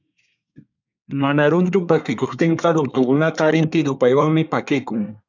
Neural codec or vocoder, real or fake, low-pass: codec, 24 kHz, 1 kbps, SNAC; fake; 7.2 kHz